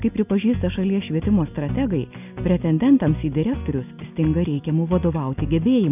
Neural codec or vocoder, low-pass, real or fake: none; 3.6 kHz; real